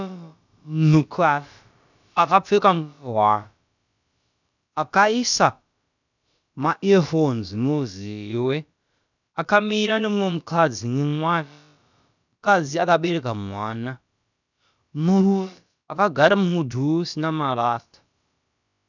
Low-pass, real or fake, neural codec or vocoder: 7.2 kHz; fake; codec, 16 kHz, about 1 kbps, DyCAST, with the encoder's durations